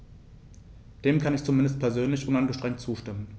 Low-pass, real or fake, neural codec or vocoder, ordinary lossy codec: none; real; none; none